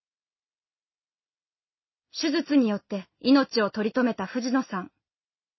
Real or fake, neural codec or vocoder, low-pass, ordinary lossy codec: real; none; 7.2 kHz; MP3, 24 kbps